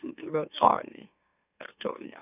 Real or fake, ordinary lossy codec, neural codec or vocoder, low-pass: fake; none; autoencoder, 44.1 kHz, a latent of 192 numbers a frame, MeloTTS; 3.6 kHz